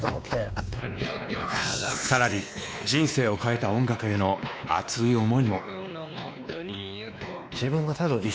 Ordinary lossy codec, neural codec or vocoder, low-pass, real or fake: none; codec, 16 kHz, 2 kbps, X-Codec, WavLM features, trained on Multilingual LibriSpeech; none; fake